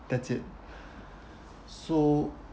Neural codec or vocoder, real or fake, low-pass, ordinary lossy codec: none; real; none; none